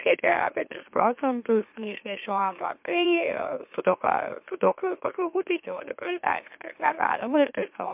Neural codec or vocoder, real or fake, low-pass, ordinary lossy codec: autoencoder, 44.1 kHz, a latent of 192 numbers a frame, MeloTTS; fake; 3.6 kHz; MP3, 32 kbps